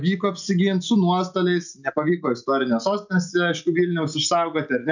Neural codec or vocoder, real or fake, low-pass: none; real; 7.2 kHz